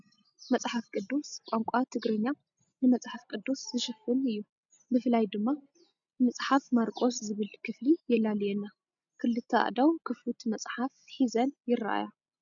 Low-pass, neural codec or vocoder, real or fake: 7.2 kHz; none; real